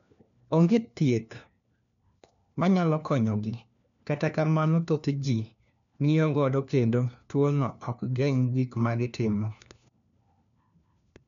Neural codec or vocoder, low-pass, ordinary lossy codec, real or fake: codec, 16 kHz, 1 kbps, FunCodec, trained on LibriTTS, 50 frames a second; 7.2 kHz; none; fake